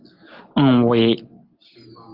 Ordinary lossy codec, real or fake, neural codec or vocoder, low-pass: Opus, 16 kbps; real; none; 5.4 kHz